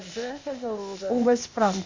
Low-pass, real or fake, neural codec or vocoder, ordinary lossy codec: 7.2 kHz; fake; codec, 16 kHz, 0.8 kbps, ZipCodec; MP3, 64 kbps